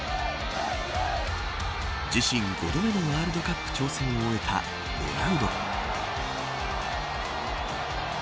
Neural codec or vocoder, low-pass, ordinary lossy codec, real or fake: none; none; none; real